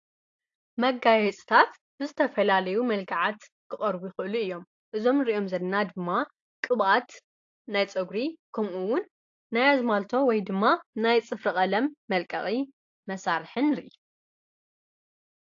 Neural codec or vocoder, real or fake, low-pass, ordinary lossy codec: none; real; 7.2 kHz; AAC, 48 kbps